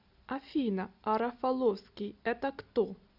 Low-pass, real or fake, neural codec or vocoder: 5.4 kHz; real; none